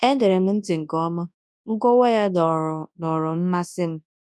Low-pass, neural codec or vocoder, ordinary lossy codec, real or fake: none; codec, 24 kHz, 0.9 kbps, WavTokenizer, large speech release; none; fake